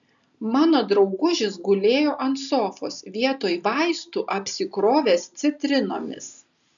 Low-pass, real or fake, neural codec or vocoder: 7.2 kHz; real; none